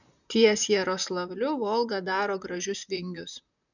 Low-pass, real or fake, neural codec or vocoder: 7.2 kHz; fake; vocoder, 44.1 kHz, 128 mel bands, Pupu-Vocoder